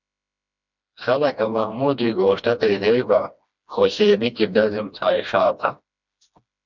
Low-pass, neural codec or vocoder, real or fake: 7.2 kHz; codec, 16 kHz, 1 kbps, FreqCodec, smaller model; fake